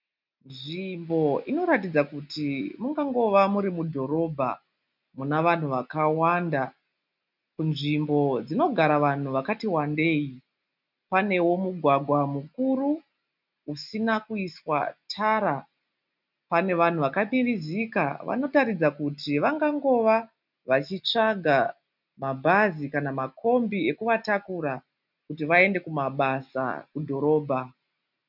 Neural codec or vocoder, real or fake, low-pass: none; real; 5.4 kHz